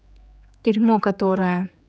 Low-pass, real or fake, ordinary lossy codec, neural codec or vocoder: none; fake; none; codec, 16 kHz, 4 kbps, X-Codec, HuBERT features, trained on general audio